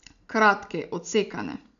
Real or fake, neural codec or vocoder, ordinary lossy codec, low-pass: real; none; none; 7.2 kHz